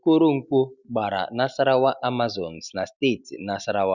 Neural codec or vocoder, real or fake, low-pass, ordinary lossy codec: none; real; 7.2 kHz; none